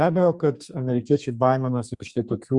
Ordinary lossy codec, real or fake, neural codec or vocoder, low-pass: Opus, 64 kbps; fake; codec, 32 kHz, 1.9 kbps, SNAC; 10.8 kHz